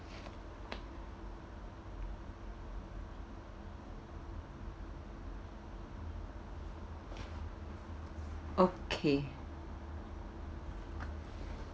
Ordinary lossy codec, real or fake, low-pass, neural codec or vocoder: none; real; none; none